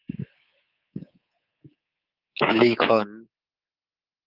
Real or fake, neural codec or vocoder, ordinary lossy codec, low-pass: fake; codec, 24 kHz, 3.1 kbps, DualCodec; Opus, 24 kbps; 5.4 kHz